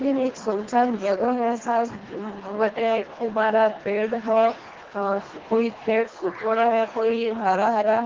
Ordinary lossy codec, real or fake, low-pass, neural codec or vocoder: Opus, 16 kbps; fake; 7.2 kHz; codec, 24 kHz, 1.5 kbps, HILCodec